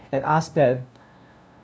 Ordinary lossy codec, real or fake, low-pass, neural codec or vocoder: none; fake; none; codec, 16 kHz, 0.5 kbps, FunCodec, trained on LibriTTS, 25 frames a second